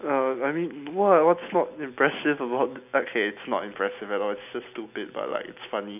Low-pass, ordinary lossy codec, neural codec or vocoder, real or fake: 3.6 kHz; none; none; real